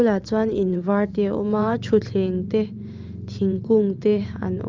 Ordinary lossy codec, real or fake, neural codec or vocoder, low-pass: Opus, 24 kbps; fake; vocoder, 44.1 kHz, 128 mel bands every 512 samples, BigVGAN v2; 7.2 kHz